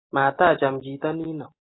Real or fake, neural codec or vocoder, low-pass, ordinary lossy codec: real; none; 7.2 kHz; AAC, 16 kbps